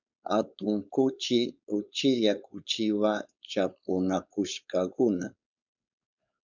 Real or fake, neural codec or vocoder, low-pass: fake; codec, 16 kHz, 4.8 kbps, FACodec; 7.2 kHz